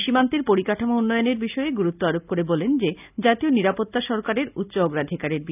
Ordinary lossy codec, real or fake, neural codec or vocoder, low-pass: none; real; none; 3.6 kHz